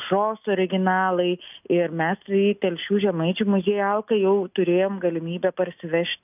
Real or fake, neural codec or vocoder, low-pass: real; none; 3.6 kHz